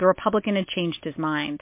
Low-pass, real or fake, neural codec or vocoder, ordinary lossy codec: 3.6 kHz; real; none; MP3, 24 kbps